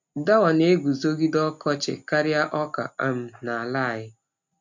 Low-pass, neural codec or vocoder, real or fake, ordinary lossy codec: 7.2 kHz; none; real; none